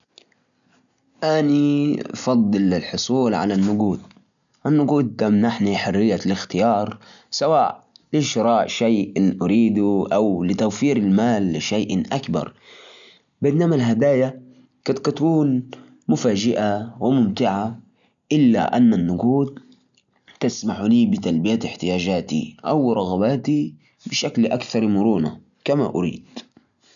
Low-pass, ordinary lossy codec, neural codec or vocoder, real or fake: 7.2 kHz; none; none; real